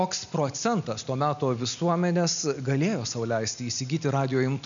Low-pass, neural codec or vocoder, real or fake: 7.2 kHz; none; real